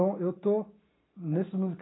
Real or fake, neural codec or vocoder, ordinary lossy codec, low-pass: real; none; AAC, 16 kbps; 7.2 kHz